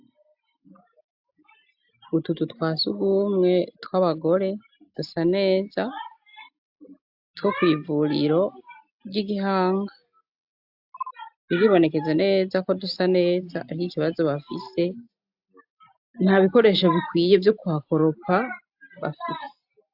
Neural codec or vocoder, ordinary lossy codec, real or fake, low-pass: none; AAC, 48 kbps; real; 5.4 kHz